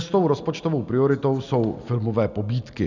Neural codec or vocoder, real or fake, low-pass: none; real; 7.2 kHz